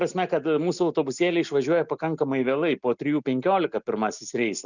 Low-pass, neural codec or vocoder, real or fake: 7.2 kHz; none; real